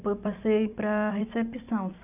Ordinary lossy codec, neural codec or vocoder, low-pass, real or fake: none; vocoder, 44.1 kHz, 128 mel bands every 256 samples, BigVGAN v2; 3.6 kHz; fake